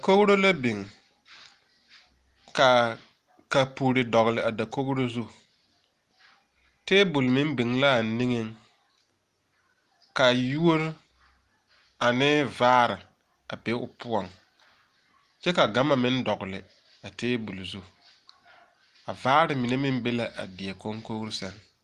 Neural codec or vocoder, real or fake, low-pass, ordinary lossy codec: none; real; 14.4 kHz; Opus, 24 kbps